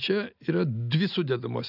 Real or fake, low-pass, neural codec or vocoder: real; 5.4 kHz; none